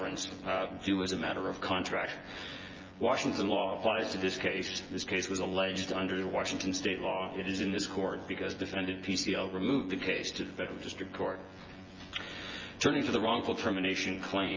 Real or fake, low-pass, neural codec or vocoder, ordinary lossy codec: fake; 7.2 kHz; vocoder, 24 kHz, 100 mel bands, Vocos; Opus, 32 kbps